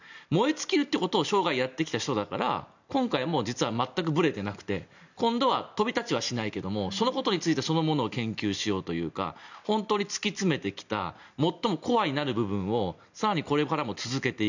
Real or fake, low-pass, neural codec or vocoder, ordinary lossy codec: real; 7.2 kHz; none; none